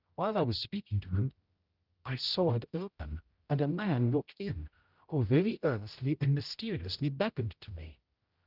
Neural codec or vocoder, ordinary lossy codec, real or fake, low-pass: codec, 16 kHz, 0.5 kbps, X-Codec, HuBERT features, trained on general audio; Opus, 24 kbps; fake; 5.4 kHz